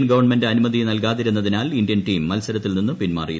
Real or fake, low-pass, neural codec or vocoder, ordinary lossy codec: real; none; none; none